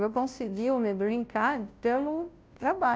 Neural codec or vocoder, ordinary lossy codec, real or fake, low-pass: codec, 16 kHz, 0.5 kbps, FunCodec, trained on Chinese and English, 25 frames a second; none; fake; none